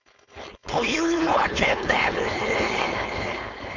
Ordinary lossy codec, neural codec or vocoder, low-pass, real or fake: none; codec, 16 kHz, 4.8 kbps, FACodec; 7.2 kHz; fake